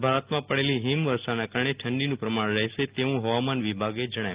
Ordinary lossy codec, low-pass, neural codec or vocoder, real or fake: Opus, 32 kbps; 3.6 kHz; none; real